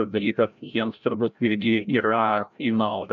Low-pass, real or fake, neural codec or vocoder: 7.2 kHz; fake; codec, 16 kHz, 0.5 kbps, FreqCodec, larger model